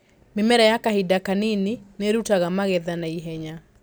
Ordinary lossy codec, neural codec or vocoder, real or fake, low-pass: none; none; real; none